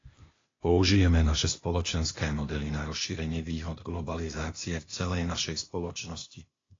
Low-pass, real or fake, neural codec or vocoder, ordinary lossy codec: 7.2 kHz; fake; codec, 16 kHz, 0.8 kbps, ZipCodec; AAC, 32 kbps